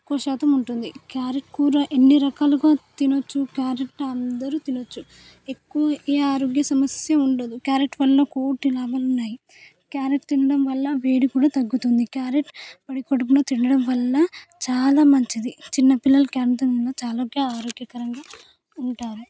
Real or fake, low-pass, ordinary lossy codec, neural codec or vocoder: real; none; none; none